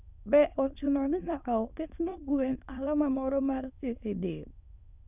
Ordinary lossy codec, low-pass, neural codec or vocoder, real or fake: none; 3.6 kHz; autoencoder, 22.05 kHz, a latent of 192 numbers a frame, VITS, trained on many speakers; fake